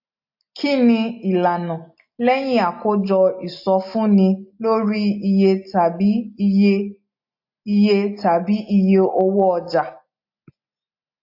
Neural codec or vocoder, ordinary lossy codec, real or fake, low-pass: none; MP3, 32 kbps; real; 5.4 kHz